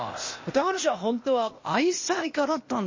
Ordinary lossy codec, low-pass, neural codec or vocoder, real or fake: MP3, 32 kbps; 7.2 kHz; codec, 16 kHz, 0.8 kbps, ZipCodec; fake